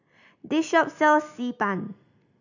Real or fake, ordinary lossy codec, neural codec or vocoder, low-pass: real; none; none; 7.2 kHz